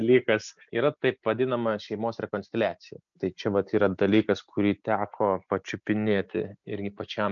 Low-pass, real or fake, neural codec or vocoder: 7.2 kHz; real; none